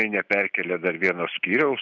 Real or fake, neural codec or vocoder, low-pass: real; none; 7.2 kHz